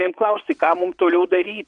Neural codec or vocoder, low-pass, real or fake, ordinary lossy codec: vocoder, 22.05 kHz, 80 mel bands, WaveNeXt; 9.9 kHz; fake; Opus, 64 kbps